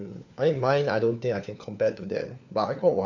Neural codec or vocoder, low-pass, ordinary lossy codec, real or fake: codec, 16 kHz, 4 kbps, FunCodec, trained on LibriTTS, 50 frames a second; 7.2 kHz; none; fake